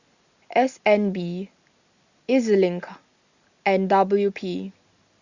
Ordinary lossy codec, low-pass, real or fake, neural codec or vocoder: Opus, 64 kbps; 7.2 kHz; real; none